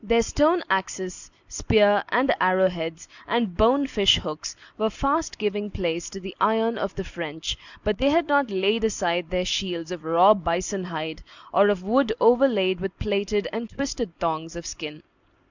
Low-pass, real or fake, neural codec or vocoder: 7.2 kHz; real; none